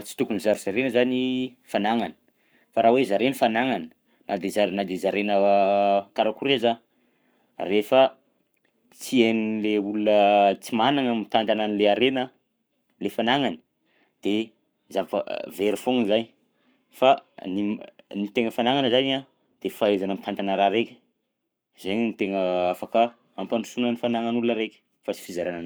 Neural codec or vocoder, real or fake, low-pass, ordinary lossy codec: codec, 44.1 kHz, 7.8 kbps, Pupu-Codec; fake; none; none